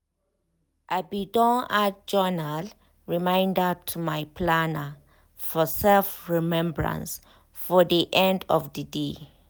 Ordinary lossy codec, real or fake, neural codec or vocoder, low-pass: none; real; none; none